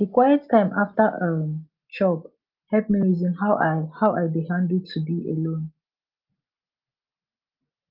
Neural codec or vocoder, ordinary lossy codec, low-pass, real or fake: none; Opus, 24 kbps; 5.4 kHz; real